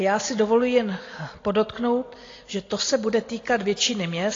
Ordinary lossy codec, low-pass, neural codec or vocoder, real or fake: AAC, 32 kbps; 7.2 kHz; none; real